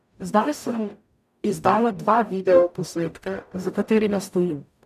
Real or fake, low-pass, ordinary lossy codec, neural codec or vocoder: fake; 14.4 kHz; none; codec, 44.1 kHz, 0.9 kbps, DAC